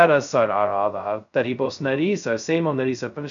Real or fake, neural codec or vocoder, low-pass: fake; codec, 16 kHz, 0.2 kbps, FocalCodec; 7.2 kHz